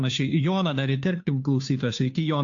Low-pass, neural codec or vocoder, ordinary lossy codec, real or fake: 7.2 kHz; codec, 16 kHz, 2 kbps, FunCodec, trained on Chinese and English, 25 frames a second; AAC, 48 kbps; fake